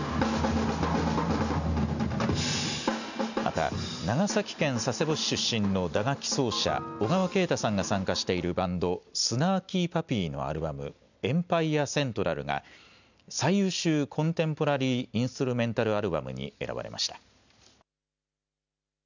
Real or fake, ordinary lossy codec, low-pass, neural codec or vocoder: real; none; 7.2 kHz; none